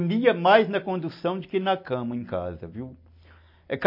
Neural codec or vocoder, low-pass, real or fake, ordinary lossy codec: none; 5.4 kHz; real; MP3, 32 kbps